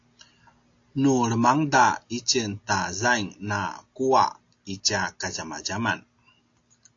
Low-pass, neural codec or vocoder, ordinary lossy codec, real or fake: 7.2 kHz; none; AAC, 48 kbps; real